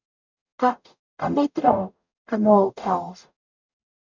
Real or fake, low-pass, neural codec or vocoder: fake; 7.2 kHz; codec, 44.1 kHz, 0.9 kbps, DAC